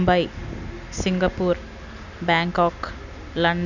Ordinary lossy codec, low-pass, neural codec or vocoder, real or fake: none; 7.2 kHz; none; real